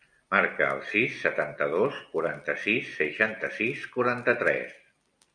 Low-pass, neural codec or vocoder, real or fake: 9.9 kHz; none; real